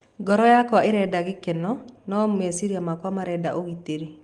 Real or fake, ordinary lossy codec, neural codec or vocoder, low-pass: real; Opus, 24 kbps; none; 10.8 kHz